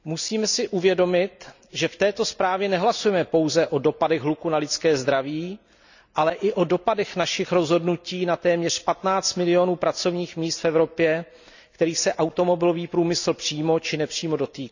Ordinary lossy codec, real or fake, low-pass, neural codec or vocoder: none; real; 7.2 kHz; none